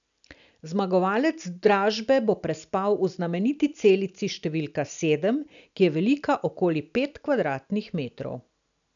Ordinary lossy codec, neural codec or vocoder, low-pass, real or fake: none; none; 7.2 kHz; real